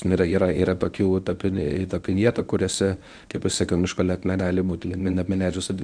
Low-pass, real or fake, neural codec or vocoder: 9.9 kHz; fake; codec, 24 kHz, 0.9 kbps, WavTokenizer, medium speech release version 1